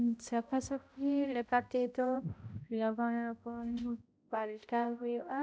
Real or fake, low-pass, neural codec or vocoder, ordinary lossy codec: fake; none; codec, 16 kHz, 0.5 kbps, X-Codec, HuBERT features, trained on balanced general audio; none